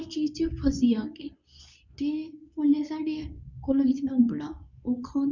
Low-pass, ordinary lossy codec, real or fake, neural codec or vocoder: 7.2 kHz; none; fake; codec, 24 kHz, 0.9 kbps, WavTokenizer, medium speech release version 2